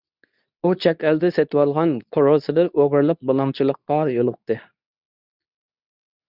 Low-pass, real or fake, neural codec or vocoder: 5.4 kHz; fake; codec, 24 kHz, 0.9 kbps, WavTokenizer, medium speech release version 2